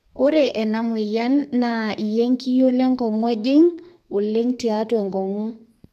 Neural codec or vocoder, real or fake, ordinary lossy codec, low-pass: codec, 32 kHz, 1.9 kbps, SNAC; fake; none; 14.4 kHz